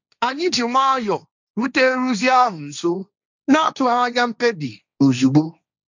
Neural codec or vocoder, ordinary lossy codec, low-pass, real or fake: codec, 16 kHz, 1.1 kbps, Voila-Tokenizer; none; 7.2 kHz; fake